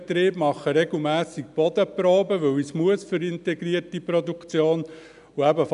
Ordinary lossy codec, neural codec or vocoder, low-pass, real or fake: none; none; 10.8 kHz; real